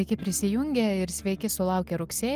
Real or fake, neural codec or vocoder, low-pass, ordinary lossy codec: real; none; 14.4 kHz; Opus, 24 kbps